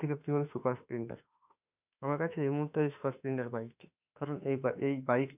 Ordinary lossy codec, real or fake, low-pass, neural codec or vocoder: none; fake; 3.6 kHz; autoencoder, 48 kHz, 32 numbers a frame, DAC-VAE, trained on Japanese speech